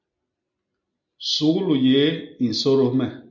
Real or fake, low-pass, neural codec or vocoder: real; 7.2 kHz; none